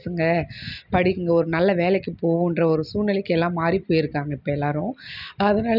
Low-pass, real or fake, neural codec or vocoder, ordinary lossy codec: 5.4 kHz; real; none; none